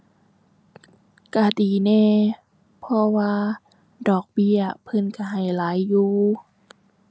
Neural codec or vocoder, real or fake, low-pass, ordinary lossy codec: none; real; none; none